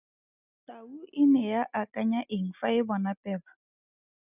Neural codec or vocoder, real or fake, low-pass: none; real; 3.6 kHz